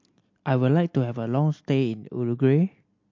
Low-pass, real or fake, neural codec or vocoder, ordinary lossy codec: 7.2 kHz; real; none; MP3, 48 kbps